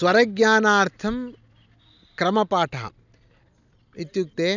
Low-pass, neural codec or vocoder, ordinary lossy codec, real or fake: 7.2 kHz; none; none; real